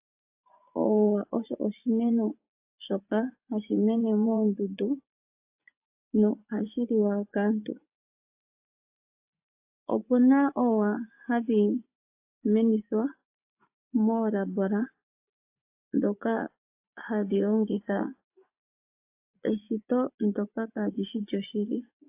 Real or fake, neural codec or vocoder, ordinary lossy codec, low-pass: fake; vocoder, 24 kHz, 100 mel bands, Vocos; AAC, 32 kbps; 3.6 kHz